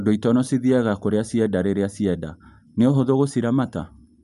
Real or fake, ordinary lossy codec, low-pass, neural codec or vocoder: real; MP3, 96 kbps; 10.8 kHz; none